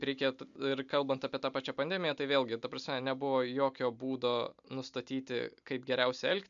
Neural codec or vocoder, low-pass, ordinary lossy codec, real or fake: none; 7.2 kHz; MP3, 96 kbps; real